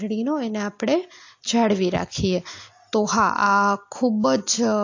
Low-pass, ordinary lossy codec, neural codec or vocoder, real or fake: 7.2 kHz; AAC, 48 kbps; none; real